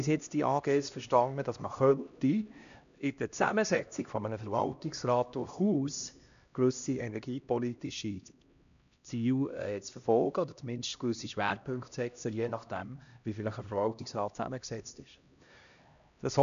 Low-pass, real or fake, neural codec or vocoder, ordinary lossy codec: 7.2 kHz; fake; codec, 16 kHz, 1 kbps, X-Codec, HuBERT features, trained on LibriSpeech; none